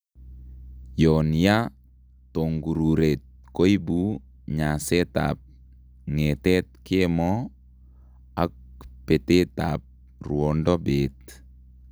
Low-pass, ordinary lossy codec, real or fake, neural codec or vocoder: none; none; real; none